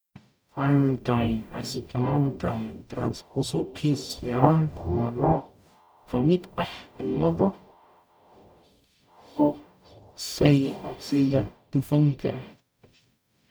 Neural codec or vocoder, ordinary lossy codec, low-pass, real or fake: codec, 44.1 kHz, 0.9 kbps, DAC; none; none; fake